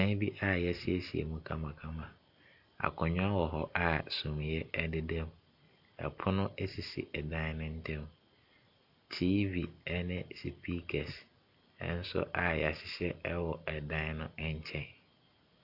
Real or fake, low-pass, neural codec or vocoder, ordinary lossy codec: real; 5.4 kHz; none; AAC, 48 kbps